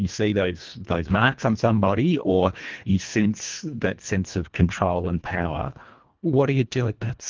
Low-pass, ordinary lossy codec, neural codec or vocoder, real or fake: 7.2 kHz; Opus, 32 kbps; codec, 24 kHz, 1.5 kbps, HILCodec; fake